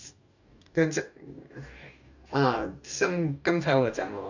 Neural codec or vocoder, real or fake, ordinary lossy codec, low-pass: codec, 44.1 kHz, 2.6 kbps, DAC; fake; none; 7.2 kHz